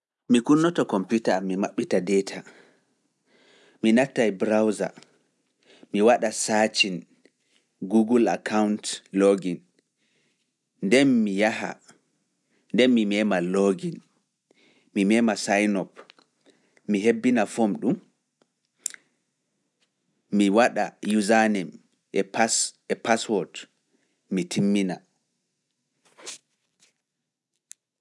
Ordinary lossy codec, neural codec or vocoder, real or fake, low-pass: none; none; real; none